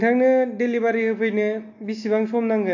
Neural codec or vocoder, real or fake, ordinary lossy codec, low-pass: none; real; AAC, 48 kbps; 7.2 kHz